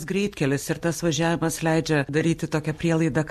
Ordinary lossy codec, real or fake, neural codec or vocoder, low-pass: MP3, 64 kbps; real; none; 14.4 kHz